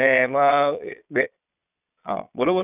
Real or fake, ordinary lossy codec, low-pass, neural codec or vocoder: fake; none; 3.6 kHz; vocoder, 22.05 kHz, 80 mel bands, Vocos